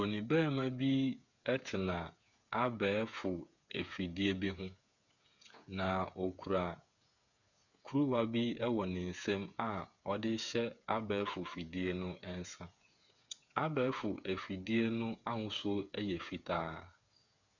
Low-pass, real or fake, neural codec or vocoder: 7.2 kHz; fake; codec, 16 kHz, 8 kbps, FreqCodec, smaller model